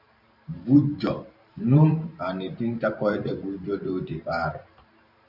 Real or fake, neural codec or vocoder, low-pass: fake; vocoder, 44.1 kHz, 128 mel bands every 512 samples, BigVGAN v2; 5.4 kHz